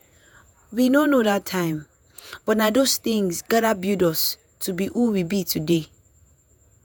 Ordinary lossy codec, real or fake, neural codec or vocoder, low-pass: none; fake; vocoder, 48 kHz, 128 mel bands, Vocos; none